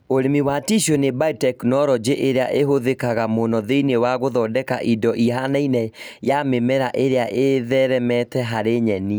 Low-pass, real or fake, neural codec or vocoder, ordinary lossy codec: none; real; none; none